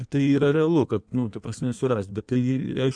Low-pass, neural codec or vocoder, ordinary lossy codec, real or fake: 9.9 kHz; codec, 16 kHz in and 24 kHz out, 1.1 kbps, FireRedTTS-2 codec; MP3, 96 kbps; fake